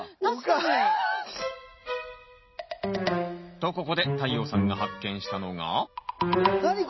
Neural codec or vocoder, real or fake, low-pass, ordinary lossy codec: none; real; 7.2 kHz; MP3, 24 kbps